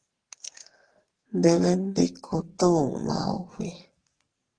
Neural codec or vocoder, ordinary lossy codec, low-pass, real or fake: codec, 44.1 kHz, 2.6 kbps, SNAC; Opus, 16 kbps; 9.9 kHz; fake